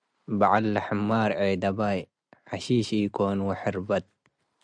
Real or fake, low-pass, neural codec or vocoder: real; 9.9 kHz; none